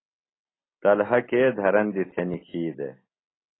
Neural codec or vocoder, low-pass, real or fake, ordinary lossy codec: none; 7.2 kHz; real; AAC, 16 kbps